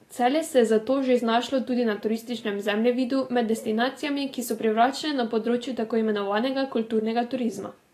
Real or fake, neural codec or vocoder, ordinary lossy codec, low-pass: real; none; AAC, 48 kbps; 14.4 kHz